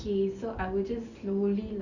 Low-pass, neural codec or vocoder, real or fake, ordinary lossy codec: 7.2 kHz; none; real; none